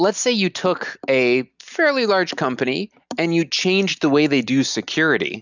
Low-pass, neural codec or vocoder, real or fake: 7.2 kHz; none; real